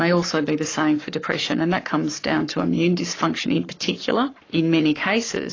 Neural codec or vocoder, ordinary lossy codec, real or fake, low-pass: codec, 44.1 kHz, 7.8 kbps, Pupu-Codec; AAC, 32 kbps; fake; 7.2 kHz